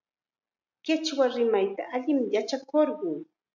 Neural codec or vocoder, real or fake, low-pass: none; real; 7.2 kHz